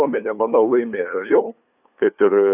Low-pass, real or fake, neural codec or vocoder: 3.6 kHz; fake; codec, 16 kHz, 2 kbps, FunCodec, trained on LibriTTS, 25 frames a second